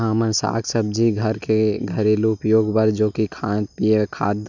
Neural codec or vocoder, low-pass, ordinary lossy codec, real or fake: none; 7.2 kHz; none; real